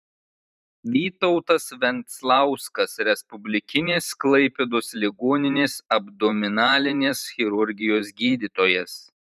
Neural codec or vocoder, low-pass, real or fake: vocoder, 44.1 kHz, 128 mel bands every 512 samples, BigVGAN v2; 14.4 kHz; fake